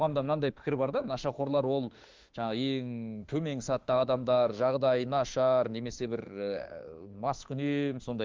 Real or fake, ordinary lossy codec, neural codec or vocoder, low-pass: fake; Opus, 32 kbps; autoencoder, 48 kHz, 32 numbers a frame, DAC-VAE, trained on Japanese speech; 7.2 kHz